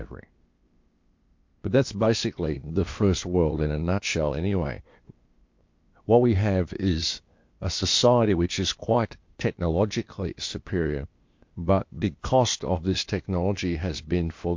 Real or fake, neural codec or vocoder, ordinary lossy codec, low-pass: fake; codec, 16 kHz, 0.8 kbps, ZipCodec; MP3, 48 kbps; 7.2 kHz